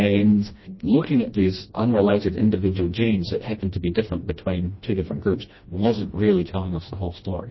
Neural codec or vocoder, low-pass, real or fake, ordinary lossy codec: codec, 16 kHz, 1 kbps, FreqCodec, smaller model; 7.2 kHz; fake; MP3, 24 kbps